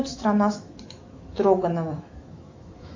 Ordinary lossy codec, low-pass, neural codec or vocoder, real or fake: AAC, 48 kbps; 7.2 kHz; autoencoder, 48 kHz, 128 numbers a frame, DAC-VAE, trained on Japanese speech; fake